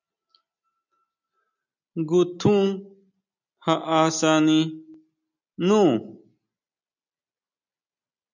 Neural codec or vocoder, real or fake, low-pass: none; real; 7.2 kHz